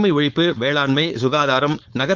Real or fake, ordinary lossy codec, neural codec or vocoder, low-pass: fake; Opus, 32 kbps; codec, 16 kHz, 4.8 kbps, FACodec; 7.2 kHz